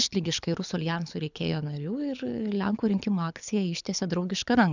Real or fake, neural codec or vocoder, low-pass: fake; codec, 24 kHz, 6 kbps, HILCodec; 7.2 kHz